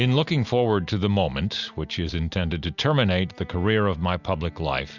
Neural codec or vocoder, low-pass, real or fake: none; 7.2 kHz; real